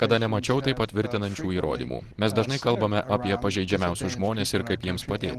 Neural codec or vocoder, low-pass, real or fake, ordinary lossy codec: none; 14.4 kHz; real; Opus, 16 kbps